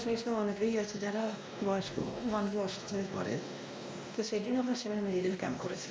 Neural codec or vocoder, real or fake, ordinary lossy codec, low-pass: codec, 16 kHz, 1 kbps, X-Codec, WavLM features, trained on Multilingual LibriSpeech; fake; none; none